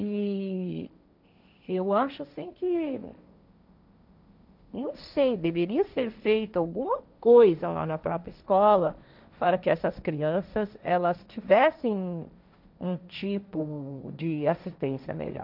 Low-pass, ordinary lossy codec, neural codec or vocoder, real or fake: 5.4 kHz; none; codec, 16 kHz, 1.1 kbps, Voila-Tokenizer; fake